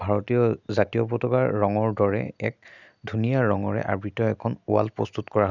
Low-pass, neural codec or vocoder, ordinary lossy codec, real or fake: 7.2 kHz; none; none; real